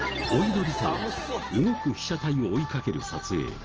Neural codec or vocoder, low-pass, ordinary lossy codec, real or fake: none; 7.2 kHz; Opus, 16 kbps; real